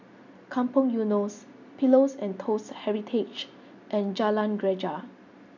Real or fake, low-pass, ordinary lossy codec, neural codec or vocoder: real; 7.2 kHz; none; none